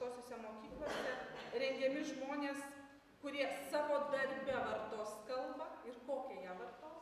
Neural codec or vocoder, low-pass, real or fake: none; 14.4 kHz; real